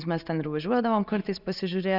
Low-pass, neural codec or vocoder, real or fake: 5.4 kHz; none; real